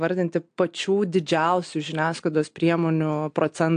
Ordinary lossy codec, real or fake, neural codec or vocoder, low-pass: AAC, 64 kbps; real; none; 9.9 kHz